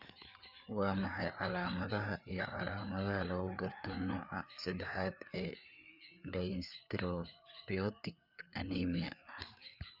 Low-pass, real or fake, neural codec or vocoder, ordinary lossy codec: 5.4 kHz; fake; codec, 16 kHz, 4 kbps, FreqCodec, larger model; none